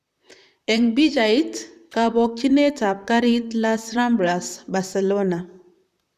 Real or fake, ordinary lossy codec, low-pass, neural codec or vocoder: fake; none; 14.4 kHz; vocoder, 44.1 kHz, 128 mel bands, Pupu-Vocoder